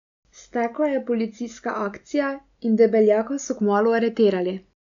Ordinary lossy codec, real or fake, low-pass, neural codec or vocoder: none; real; 7.2 kHz; none